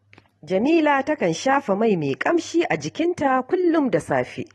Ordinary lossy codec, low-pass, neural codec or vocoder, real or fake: AAC, 32 kbps; 19.8 kHz; none; real